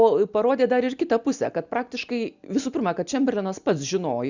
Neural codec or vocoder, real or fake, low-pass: none; real; 7.2 kHz